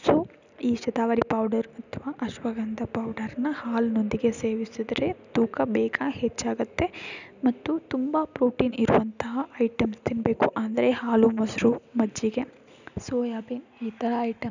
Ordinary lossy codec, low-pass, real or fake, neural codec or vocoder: none; 7.2 kHz; real; none